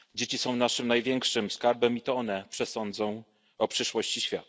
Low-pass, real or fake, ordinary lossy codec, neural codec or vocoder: none; real; none; none